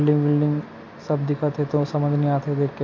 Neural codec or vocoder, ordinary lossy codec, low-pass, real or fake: none; MP3, 48 kbps; 7.2 kHz; real